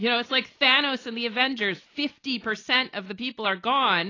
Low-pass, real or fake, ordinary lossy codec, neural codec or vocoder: 7.2 kHz; real; AAC, 32 kbps; none